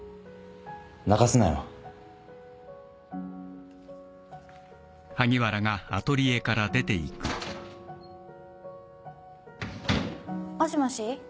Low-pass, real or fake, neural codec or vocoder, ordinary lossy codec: none; real; none; none